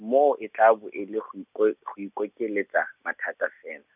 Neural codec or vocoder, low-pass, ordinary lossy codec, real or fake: none; 3.6 kHz; none; real